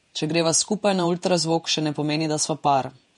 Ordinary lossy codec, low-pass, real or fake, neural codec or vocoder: MP3, 48 kbps; 19.8 kHz; fake; vocoder, 48 kHz, 128 mel bands, Vocos